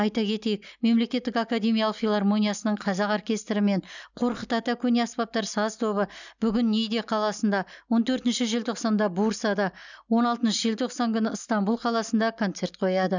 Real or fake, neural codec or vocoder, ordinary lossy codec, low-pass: real; none; none; 7.2 kHz